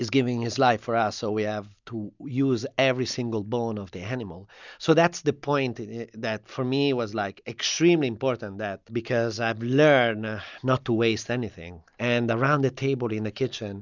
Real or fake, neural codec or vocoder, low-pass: real; none; 7.2 kHz